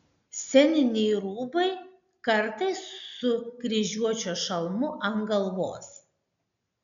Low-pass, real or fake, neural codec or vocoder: 7.2 kHz; real; none